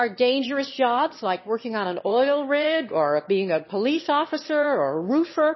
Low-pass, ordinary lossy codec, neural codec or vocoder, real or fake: 7.2 kHz; MP3, 24 kbps; autoencoder, 22.05 kHz, a latent of 192 numbers a frame, VITS, trained on one speaker; fake